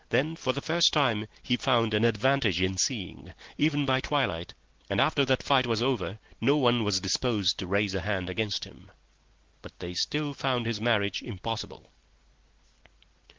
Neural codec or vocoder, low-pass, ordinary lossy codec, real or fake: none; 7.2 kHz; Opus, 16 kbps; real